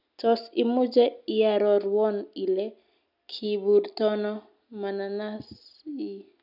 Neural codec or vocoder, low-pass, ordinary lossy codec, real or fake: none; 5.4 kHz; none; real